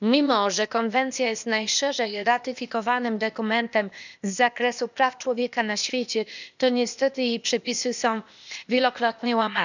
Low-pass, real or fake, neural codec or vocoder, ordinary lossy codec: 7.2 kHz; fake; codec, 16 kHz, 0.8 kbps, ZipCodec; none